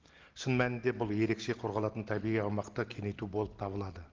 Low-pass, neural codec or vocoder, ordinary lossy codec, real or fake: 7.2 kHz; none; Opus, 16 kbps; real